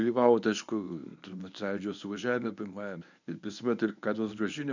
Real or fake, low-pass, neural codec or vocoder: fake; 7.2 kHz; codec, 24 kHz, 0.9 kbps, WavTokenizer, medium speech release version 1